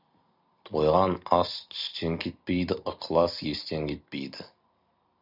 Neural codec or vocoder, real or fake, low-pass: none; real; 5.4 kHz